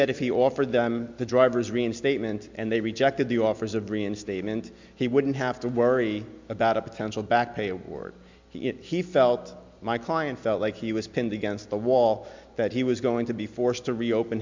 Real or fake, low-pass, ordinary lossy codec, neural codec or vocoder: real; 7.2 kHz; MP3, 64 kbps; none